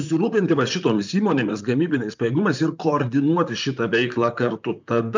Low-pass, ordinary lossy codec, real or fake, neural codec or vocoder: 7.2 kHz; MP3, 64 kbps; fake; vocoder, 44.1 kHz, 128 mel bands, Pupu-Vocoder